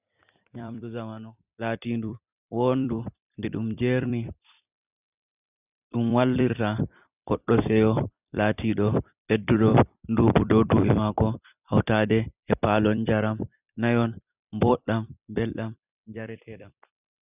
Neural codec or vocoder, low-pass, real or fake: vocoder, 44.1 kHz, 128 mel bands every 256 samples, BigVGAN v2; 3.6 kHz; fake